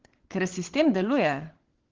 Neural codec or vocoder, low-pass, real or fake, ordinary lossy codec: codec, 16 kHz, 16 kbps, FunCodec, trained on LibriTTS, 50 frames a second; 7.2 kHz; fake; Opus, 16 kbps